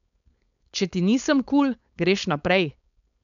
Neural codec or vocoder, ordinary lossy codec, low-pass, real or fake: codec, 16 kHz, 4.8 kbps, FACodec; none; 7.2 kHz; fake